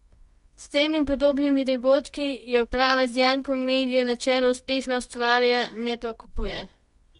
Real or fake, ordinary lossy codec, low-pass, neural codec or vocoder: fake; MP3, 64 kbps; 10.8 kHz; codec, 24 kHz, 0.9 kbps, WavTokenizer, medium music audio release